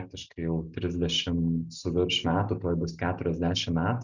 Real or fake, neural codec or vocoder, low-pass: real; none; 7.2 kHz